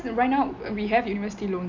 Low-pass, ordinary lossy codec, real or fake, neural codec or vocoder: 7.2 kHz; none; real; none